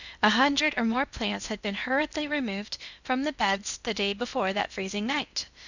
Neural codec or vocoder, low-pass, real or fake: codec, 16 kHz in and 24 kHz out, 0.8 kbps, FocalCodec, streaming, 65536 codes; 7.2 kHz; fake